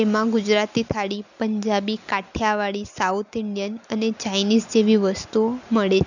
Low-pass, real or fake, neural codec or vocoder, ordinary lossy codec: 7.2 kHz; real; none; none